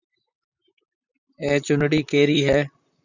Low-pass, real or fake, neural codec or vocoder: 7.2 kHz; fake; vocoder, 44.1 kHz, 128 mel bands, Pupu-Vocoder